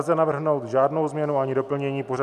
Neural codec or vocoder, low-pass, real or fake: none; 14.4 kHz; real